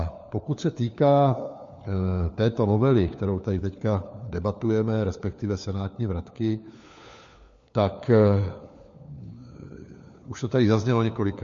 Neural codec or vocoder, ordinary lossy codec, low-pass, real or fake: codec, 16 kHz, 4 kbps, FunCodec, trained on LibriTTS, 50 frames a second; MP3, 48 kbps; 7.2 kHz; fake